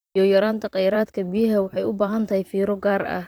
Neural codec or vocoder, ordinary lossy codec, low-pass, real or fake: vocoder, 44.1 kHz, 128 mel bands, Pupu-Vocoder; none; none; fake